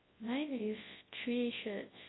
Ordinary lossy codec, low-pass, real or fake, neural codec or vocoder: AAC, 16 kbps; 7.2 kHz; fake; codec, 24 kHz, 0.9 kbps, WavTokenizer, large speech release